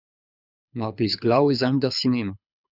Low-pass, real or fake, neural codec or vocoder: 5.4 kHz; fake; codec, 24 kHz, 6 kbps, HILCodec